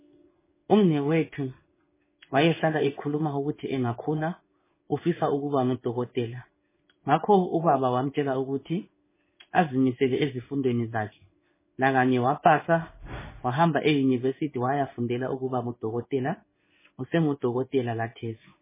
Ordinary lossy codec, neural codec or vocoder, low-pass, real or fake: MP3, 16 kbps; codec, 16 kHz in and 24 kHz out, 1 kbps, XY-Tokenizer; 3.6 kHz; fake